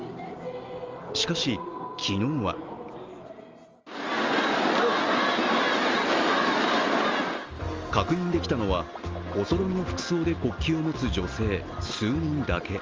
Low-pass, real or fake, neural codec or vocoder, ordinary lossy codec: 7.2 kHz; real; none; Opus, 32 kbps